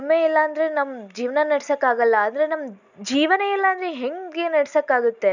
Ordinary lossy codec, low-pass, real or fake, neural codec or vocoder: none; 7.2 kHz; real; none